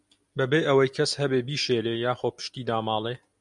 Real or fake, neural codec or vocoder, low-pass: real; none; 10.8 kHz